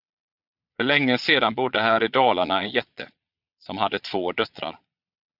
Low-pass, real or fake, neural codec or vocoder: 5.4 kHz; fake; vocoder, 22.05 kHz, 80 mel bands, Vocos